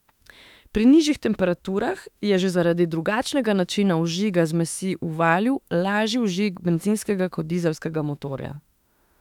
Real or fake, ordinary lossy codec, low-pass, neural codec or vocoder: fake; none; 19.8 kHz; autoencoder, 48 kHz, 32 numbers a frame, DAC-VAE, trained on Japanese speech